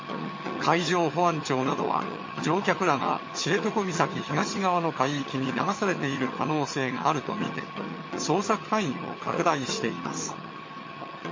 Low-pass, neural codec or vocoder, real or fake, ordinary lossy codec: 7.2 kHz; vocoder, 22.05 kHz, 80 mel bands, HiFi-GAN; fake; MP3, 32 kbps